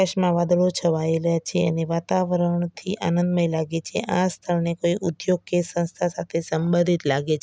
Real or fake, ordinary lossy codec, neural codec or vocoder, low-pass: real; none; none; none